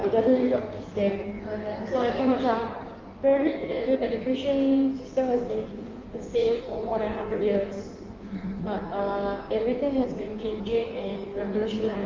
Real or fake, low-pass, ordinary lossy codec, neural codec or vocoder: fake; 7.2 kHz; Opus, 24 kbps; codec, 16 kHz in and 24 kHz out, 1.1 kbps, FireRedTTS-2 codec